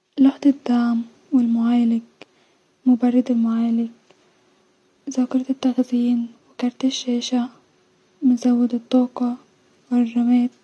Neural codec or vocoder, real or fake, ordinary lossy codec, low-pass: none; real; none; none